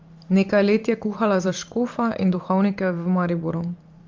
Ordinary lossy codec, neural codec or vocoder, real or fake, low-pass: Opus, 32 kbps; vocoder, 44.1 kHz, 128 mel bands every 512 samples, BigVGAN v2; fake; 7.2 kHz